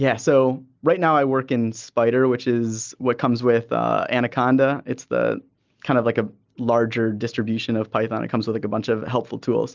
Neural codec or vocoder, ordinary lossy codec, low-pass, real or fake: none; Opus, 24 kbps; 7.2 kHz; real